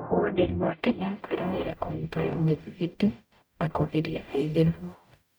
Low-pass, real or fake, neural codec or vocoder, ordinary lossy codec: none; fake; codec, 44.1 kHz, 0.9 kbps, DAC; none